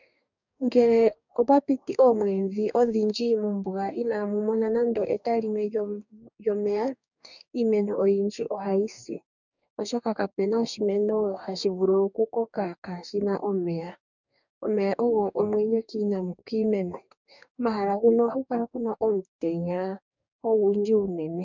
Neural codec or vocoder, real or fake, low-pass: codec, 44.1 kHz, 2.6 kbps, DAC; fake; 7.2 kHz